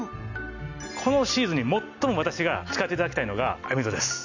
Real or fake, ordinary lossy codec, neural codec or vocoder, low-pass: real; none; none; 7.2 kHz